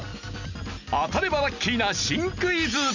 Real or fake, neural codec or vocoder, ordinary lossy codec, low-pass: real; none; none; 7.2 kHz